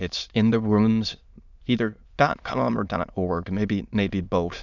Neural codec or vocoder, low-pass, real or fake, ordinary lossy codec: autoencoder, 22.05 kHz, a latent of 192 numbers a frame, VITS, trained on many speakers; 7.2 kHz; fake; Opus, 64 kbps